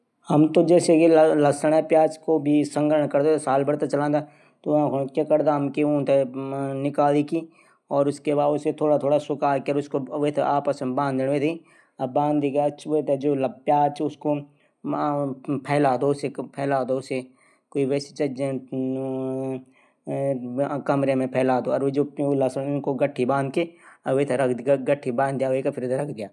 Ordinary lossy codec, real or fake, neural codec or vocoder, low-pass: none; real; none; none